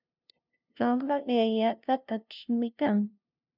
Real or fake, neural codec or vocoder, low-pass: fake; codec, 16 kHz, 0.5 kbps, FunCodec, trained on LibriTTS, 25 frames a second; 5.4 kHz